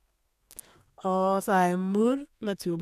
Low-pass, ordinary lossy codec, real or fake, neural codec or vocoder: 14.4 kHz; none; fake; codec, 32 kHz, 1.9 kbps, SNAC